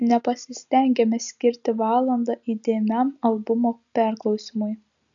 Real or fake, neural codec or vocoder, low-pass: real; none; 7.2 kHz